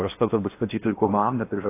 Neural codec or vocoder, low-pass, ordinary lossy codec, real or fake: codec, 16 kHz in and 24 kHz out, 0.8 kbps, FocalCodec, streaming, 65536 codes; 3.6 kHz; AAC, 24 kbps; fake